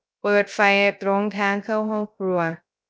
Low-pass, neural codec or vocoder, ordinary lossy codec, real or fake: none; codec, 16 kHz, 0.3 kbps, FocalCodec; none; fake